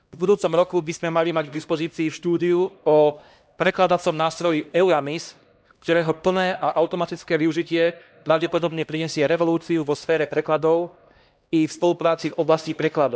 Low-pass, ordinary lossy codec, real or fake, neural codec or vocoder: none; none; fake; codec, 16 kHz, 1 kbps, X-Codec, HuBERT features, trained on LibriSpeech